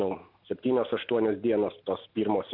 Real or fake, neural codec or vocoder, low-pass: real; none; 5.4 kHz